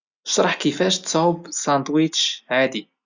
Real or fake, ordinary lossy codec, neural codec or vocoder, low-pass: real; Opus, 64 kbps; none; 7.2 kHz